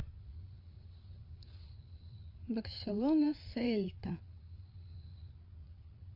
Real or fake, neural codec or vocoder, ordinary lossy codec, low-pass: fake; codec, 16 kHz, 8 kbps, FreqCodec, larger model; AAC, 32 kbps; 5.4 kHz